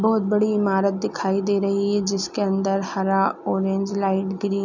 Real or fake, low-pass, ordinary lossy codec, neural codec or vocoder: real; 7.2 kHz; none; none